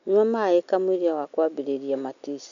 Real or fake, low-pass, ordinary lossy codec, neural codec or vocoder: real; 7.2 kHz; none; none